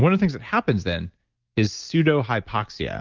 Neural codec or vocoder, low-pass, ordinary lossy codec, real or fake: none; 7.2 kHz; Opus, 32 kbps; real